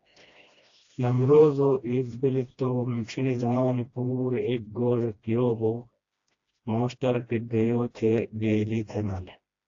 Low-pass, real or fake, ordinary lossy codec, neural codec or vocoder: 7.2 kHz; fake; AAC, 48 kbps; codec, 16 kHz, 1 kbps, FreqCodec, smaller model